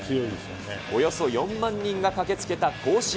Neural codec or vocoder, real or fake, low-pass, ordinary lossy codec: none; real; none; none